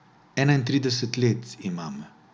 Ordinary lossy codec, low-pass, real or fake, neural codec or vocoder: none; none; real; none